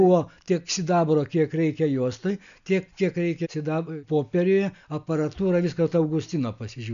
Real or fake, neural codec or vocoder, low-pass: real; none; 7.2 kHz